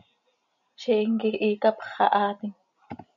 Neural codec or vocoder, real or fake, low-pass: none; real; 7.2 kHz